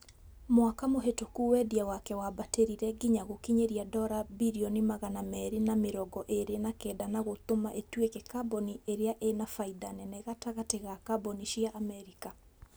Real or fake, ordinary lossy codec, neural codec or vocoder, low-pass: real; none; none; none